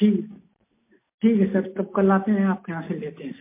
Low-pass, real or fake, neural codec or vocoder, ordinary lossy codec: 3.6 kHz; real; none; MP3, 24 kbps